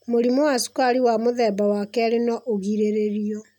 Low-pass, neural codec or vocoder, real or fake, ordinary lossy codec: 19.8 kHz; none; real; none